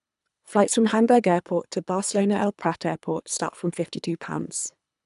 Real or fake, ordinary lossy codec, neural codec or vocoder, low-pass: fake; none; codec, 24 kHz, 3 kbps, HILCodec; 10.8 kHz